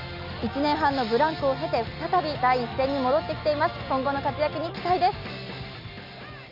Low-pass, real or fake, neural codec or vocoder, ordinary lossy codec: 5.4 kHz; real; none; none